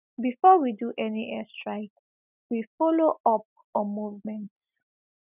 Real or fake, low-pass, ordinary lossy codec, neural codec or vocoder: real; 3.6 kHz; none; none